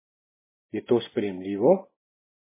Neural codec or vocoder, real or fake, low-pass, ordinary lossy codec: none; real; 3.6 kHz; MP3, 16 kbps